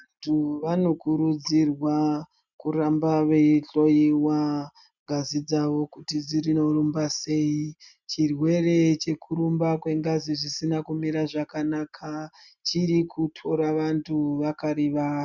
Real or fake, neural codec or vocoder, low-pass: real; none; 7.2 kHz